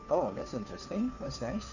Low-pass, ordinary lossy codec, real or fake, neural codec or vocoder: 7.2 kHz; none; fake; codec, 16 kHz, 2 kbps, FunCodec, trained on Chinese and English, 25 frames a second